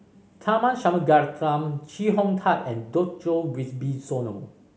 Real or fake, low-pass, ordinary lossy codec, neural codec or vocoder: real; none; none; none